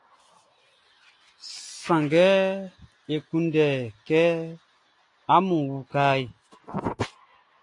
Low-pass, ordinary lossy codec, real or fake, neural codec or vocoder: 10.8 kHz; AAC, 48 kbps; real; none